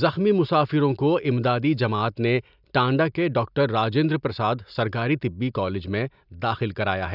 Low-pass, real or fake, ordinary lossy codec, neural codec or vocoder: 5.4 kHz; real; none; none